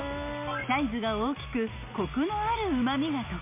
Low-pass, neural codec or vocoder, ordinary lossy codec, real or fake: 3.6 kHz; none; MP3, 32 kbps; real